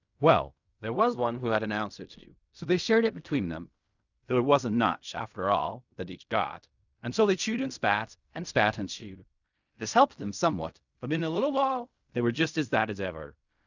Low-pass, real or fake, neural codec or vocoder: 7.2 kHz; fake; codec, 16 kHz in and 24 kHz out, 0.4 kbps, LongCat-Audio-Codec, fine tuned four codebook decoder